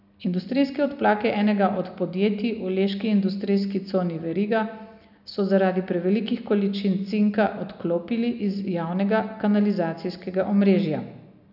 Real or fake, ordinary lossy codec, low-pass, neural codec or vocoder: real; none; 5.4 kHz; none